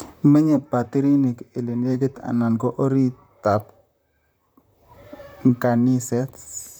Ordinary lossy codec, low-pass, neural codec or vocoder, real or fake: none; none; vocoder, 44.1 kHz, 128 mel bands, Pupu-Vocoder; fake